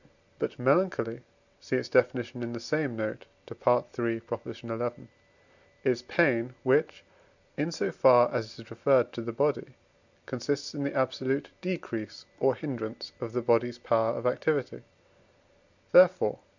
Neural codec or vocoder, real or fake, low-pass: none; real; 7.2 kHz